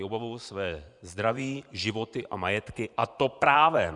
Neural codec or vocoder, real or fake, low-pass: vocoder, 24 kHz, 100 mel bands, Vocos; fake; 10.8 kHz